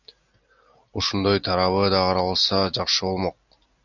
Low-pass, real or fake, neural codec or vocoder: 7.2 kHz; real; none